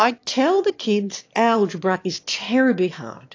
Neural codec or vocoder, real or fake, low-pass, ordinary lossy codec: autoencoder, 22.05 kHz, a latent of 192 numbers a frame, VITS, trained on one speaker; fake; 7.2 kHz; AAC, 48 kbps